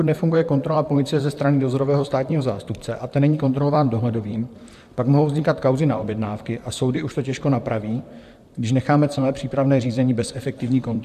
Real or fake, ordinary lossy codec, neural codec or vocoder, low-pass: fake; AAC, 96 kbps; vocoder, 44.1 kHz, 128 mel bands, Pupu-Vocoder; 14.4 kHz